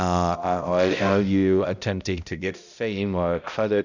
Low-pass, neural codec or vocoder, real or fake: 7.2 kHz; codec, 16 kHz, 0.5 kbps, X-Codec, HuBERT features, trained on balanced general audio; fake